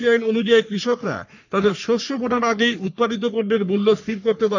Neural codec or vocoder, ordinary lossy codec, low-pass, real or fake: codec, 44.1 kHz, 3.4 kbps, Pupu-Codec; none; 7.2 kHz; fake